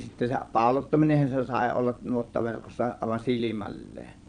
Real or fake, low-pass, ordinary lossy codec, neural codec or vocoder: fake; 9.9 kHz; none; vocoder, 22.05 kHz, 80 mel bands, Vocos